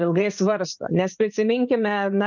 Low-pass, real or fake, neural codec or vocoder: 7.2 kHz; fake; vocoder, 44.1 kHz, 80 mel bands, Vocos